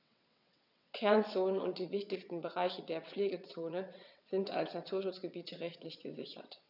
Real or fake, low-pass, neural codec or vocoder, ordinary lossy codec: fake; 5.4 kHz; vocoder, 22.05 kHz, 80 mel bands, WaveNeXt; none